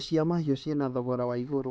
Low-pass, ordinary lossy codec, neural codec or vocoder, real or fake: none; none; codec, 16 kHz, 4 kbps, X-Codec, HuBERT features, trained on LibriSpeech; fake